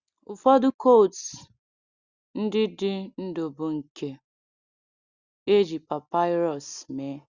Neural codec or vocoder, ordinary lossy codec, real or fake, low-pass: none; Opus, 64 kbps; real; 7.2 kHz